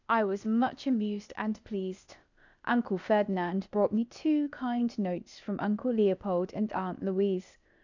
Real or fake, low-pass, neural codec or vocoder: fake; 7.2 kHz; codec, 16 kHz, 0.8 kbps, ZipCodec